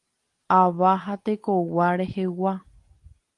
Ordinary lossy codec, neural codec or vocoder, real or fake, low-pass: Opus, 24 kbps; none; real; 10.8 kHz